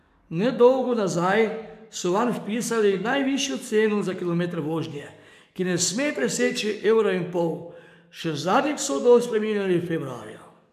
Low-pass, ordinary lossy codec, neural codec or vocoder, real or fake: 14.4 kHz; none; codec, 44.1 kHz, 7.8 kbps, DAC; fake